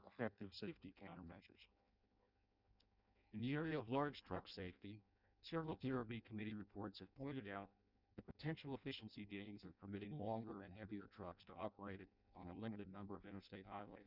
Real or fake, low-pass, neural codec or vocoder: fake; 5.4 kHz; codec, 16 kHz in and 24 kHz out, 0.6 kbps, FireRedTTS-2 codec